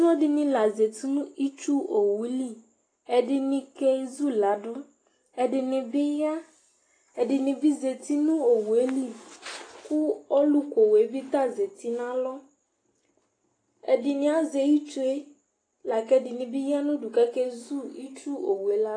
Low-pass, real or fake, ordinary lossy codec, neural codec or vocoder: 9.9 kHz; real; AAC, 32 kbps; none